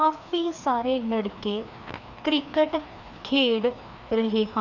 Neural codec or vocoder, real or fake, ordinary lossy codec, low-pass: codec, 16 kHz, 2 kbps, FreqCodec, larger model; fake; none; 7.2 kHz